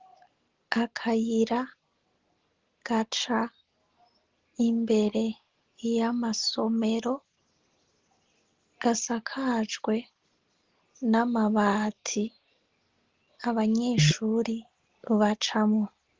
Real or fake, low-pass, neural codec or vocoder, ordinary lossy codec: real; 7.2 kHz; none; Opus, 16 kbps